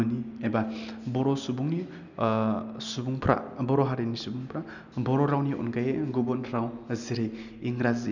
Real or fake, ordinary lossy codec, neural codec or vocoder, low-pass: real; none; none; 7.2 kHz